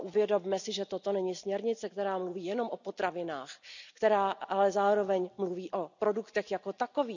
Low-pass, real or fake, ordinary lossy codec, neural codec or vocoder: 7.2 kHz; real; none; none